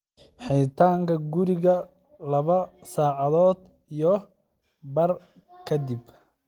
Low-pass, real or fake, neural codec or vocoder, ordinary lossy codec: 19.8 kHz; real; none; Opus, 24 kbps